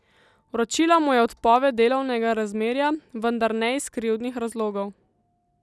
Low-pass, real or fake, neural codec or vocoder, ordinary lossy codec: none; real; none; none